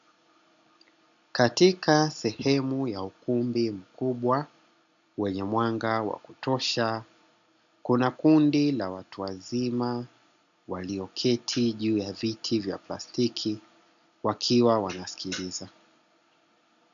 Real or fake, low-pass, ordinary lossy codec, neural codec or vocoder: real; 7.2 kHz; AAC, 96 kbps; none